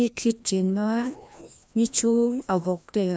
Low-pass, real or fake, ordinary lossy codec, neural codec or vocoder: none; fake; none; codec, 16 kHz, 1 kbps, FreqCodec, larger model